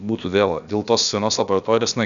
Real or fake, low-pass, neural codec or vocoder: fake; 7.2 kHz; codec, 16 kHz, about 1 kbps, DyCAST, with the encoder's durations